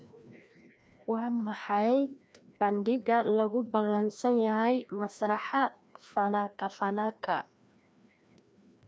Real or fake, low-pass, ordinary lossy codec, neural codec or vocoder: fake; none; none; codec, 16 kHz, 1 kbps, FreqCodec, larger model